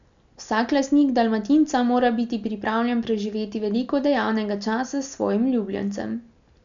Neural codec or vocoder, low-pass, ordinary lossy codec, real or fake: none; 7.2 kHz; none; real